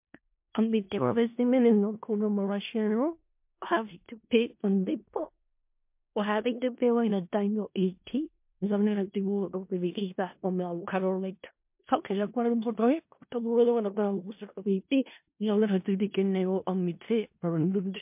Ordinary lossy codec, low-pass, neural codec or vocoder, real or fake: MP3, 32 kbps; 3.6 kHz; codec, 16 kHz in and 24 kHz out, 0.4 kbps, LongCat-Audio-Codec, four codebook decoder; fake